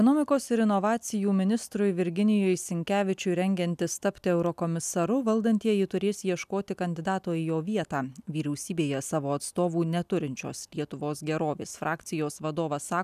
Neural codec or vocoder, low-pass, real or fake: none; 14.4 kHz; real